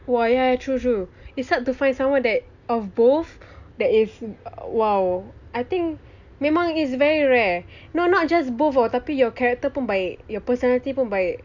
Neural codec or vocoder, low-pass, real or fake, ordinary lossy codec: none; 7.2 kHz; real; none